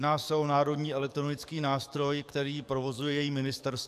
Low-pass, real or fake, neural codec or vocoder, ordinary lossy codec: 14.4 kHz; fake; codec, 44.1 kHz, 7.8 kbps, DAC; AAC, 96 kbps